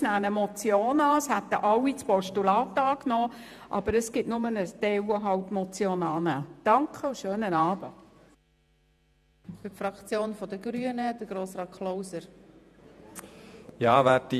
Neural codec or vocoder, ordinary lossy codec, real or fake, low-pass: vocoder, 48 kHz, 128 mel bands, Vocos; none; fake; 14.4 kHz